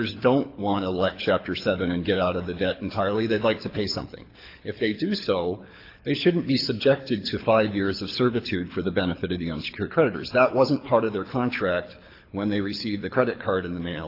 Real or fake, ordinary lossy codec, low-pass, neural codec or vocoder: fake; AAC, 32 kbps; 5.4 kHz; codec, 24 kHz, 6 kbps, HILCodec